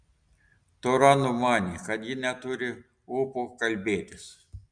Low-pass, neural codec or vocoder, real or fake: 9.9 kHz; none; real